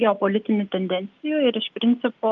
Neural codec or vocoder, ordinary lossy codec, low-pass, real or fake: none; Opus, 32 kbps; 7.2 kHz; real